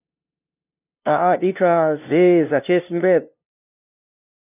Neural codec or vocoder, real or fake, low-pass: codec, 16 kHz, 0.5 kbps, FunCodec, trained on LibriTTS, 25 frames a second; fake; 3.6 kHz